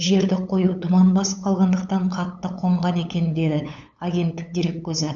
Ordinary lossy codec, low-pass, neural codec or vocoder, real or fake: none; 7.2 kHz; codec, 16 kHz, 8 kbps, FunCodec, trained on LibriTTS, 25 frames a second; fake